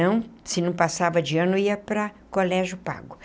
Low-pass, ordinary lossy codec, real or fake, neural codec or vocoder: none; none; real; none